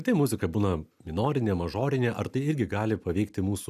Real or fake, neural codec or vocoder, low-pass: real; none; 14.4 kHz